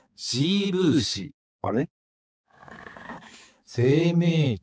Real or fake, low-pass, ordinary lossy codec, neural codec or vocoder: fake; none; none; codec, 16 kHz, 4 kbps, X-Codec, HuBERT features, trained on balanced general audio